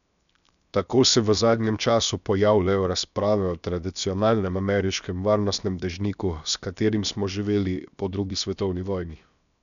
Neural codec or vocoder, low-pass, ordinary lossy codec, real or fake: codec, 16 kHz, 0.7 kbps, FocalCodec; 7.2 kHz; none; fake